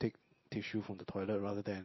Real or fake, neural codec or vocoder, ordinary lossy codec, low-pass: real; none; MP3, 24 kbps; 7.2 kHz